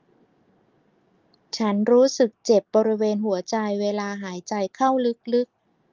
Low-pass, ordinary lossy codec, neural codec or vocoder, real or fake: 7.2 kHz; Opus, 32 kbps; none; real